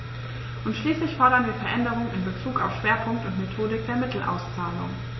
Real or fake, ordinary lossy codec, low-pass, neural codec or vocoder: real; MP3, 24 kbps; 7.2 kHz; none